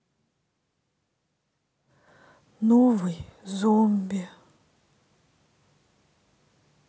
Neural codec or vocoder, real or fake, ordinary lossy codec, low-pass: none; real; none; none